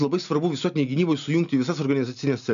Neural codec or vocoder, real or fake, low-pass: none; real; 7.2 kHz